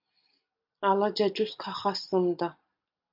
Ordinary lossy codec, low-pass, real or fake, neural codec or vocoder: MP3, 48 kbps; 5.4 kHz; real; none